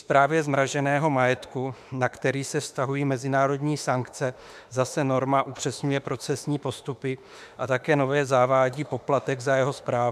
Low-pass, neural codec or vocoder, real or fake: 14.4 kHz; autoencoder, 48 kHz, 32 numbers a frame, DAC-VAE, trained on Japanese speech; fake